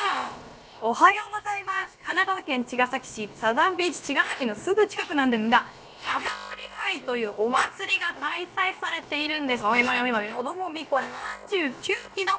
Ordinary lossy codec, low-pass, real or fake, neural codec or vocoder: none; none; fake; codec, 16 kHz, about 1 kbps, DyCAST, with the encoder's durations